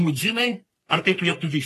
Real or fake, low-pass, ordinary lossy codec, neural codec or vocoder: fake; 14.4 kHz; AAC, 48 kbps; codec, 44.1 kHz, 3.4 kbps, Pupu-Codec